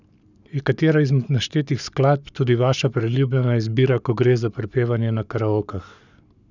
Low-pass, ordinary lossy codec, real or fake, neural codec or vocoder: 7.2 kHz; none; fake; codec, 44.1 kHz, 7.8 kbps, Pupu-Codec